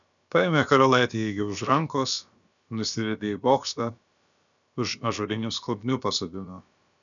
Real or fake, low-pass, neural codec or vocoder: fake; 7.2 kHz; codec, 16 kHz, about 1 kbps, DyCAST, with the encoder's durations